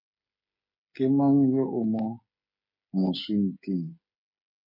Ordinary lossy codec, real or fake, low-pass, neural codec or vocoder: MP3, 24 kbps; fake; 5.4 kHz; codec, 16 kHz, 8 kbps, FreqCodec, smaller model